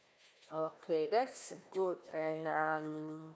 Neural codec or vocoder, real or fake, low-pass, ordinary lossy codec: codec, 16 kHz, 1 kbps, FunCodec, trained on Chinese and English, 50 frames a second; fake; none; none